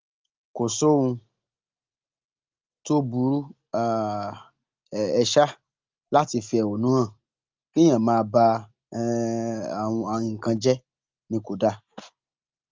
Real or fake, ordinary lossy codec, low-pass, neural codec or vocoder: real; Opus, 24 kbps; 7.2 kHz; none